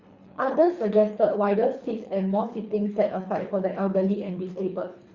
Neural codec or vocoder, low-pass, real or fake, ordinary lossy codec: codec, 24 kHz, 3 kbps, HILCodec; 7.2 kHz; fake; Opus, 64 kbps